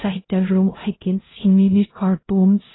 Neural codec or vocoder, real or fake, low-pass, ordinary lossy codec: codec, 16 kHz, 0.5 kbps, X-Codec, HuBERT features, trained on LibriSpeech; fake; 7.2 kHz; AAC, 16 kbps